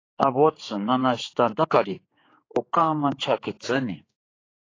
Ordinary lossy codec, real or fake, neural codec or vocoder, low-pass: AAC, 32 kbps; fake; codec, 16 kHz, 4 kbps, X-Codec, HuBERT features, trained on general audio; 7.2 kHz